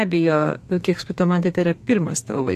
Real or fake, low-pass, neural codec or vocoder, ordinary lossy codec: fake; 14.4 kHz; codec, 32 kHz, 1.9 kbps, SNAC; AAC, 64 kbps